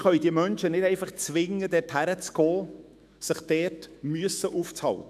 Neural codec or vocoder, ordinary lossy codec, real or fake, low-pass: autoencoder, 48 kHz, 128 numbers a frame, DAC-VAE, trained on Japanese speech; none; fake; 14.4 kHz